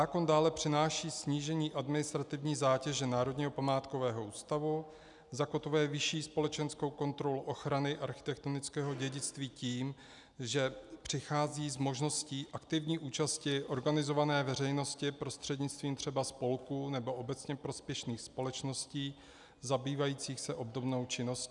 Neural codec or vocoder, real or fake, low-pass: none; real; 10.8 kHz